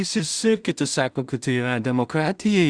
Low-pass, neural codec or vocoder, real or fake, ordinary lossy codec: 9.9 kHz; codec, 16 kHz in and 24 kHz out, 0.4 kbps, LongCat-Audio-Codec, two codebook decoder; fake; Opus, 64 kbps